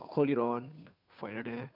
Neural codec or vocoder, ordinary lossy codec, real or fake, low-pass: codec, 16 kHz, 2 kbps, FunCodec, trained on Chinese and English, 25 frames a second; none; fake; 5.4 kHz